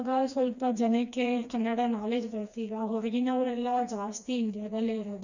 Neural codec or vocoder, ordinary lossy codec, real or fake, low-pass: codec, 16 kHz, 2 kbps, FreqCodec, smaller model; none; fake; 7.2 kHz